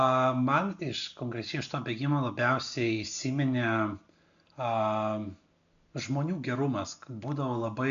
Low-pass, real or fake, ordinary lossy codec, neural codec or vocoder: 7.2 kHz; real; AAC, 96 kbps; none